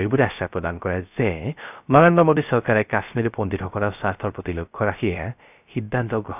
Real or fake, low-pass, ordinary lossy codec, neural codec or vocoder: fake; 3.6 kHz; none; codec, 16 kHz, 0.3 kbps, FocalCodec